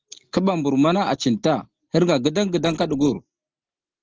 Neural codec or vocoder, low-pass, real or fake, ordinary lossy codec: none; 7.2 kHz; real; Opus, 16 kbps